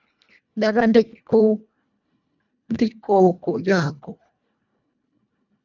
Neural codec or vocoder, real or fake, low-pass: codec, 24 kHz, 1.5 kbps, HILCodec; fake; 7.2 kHz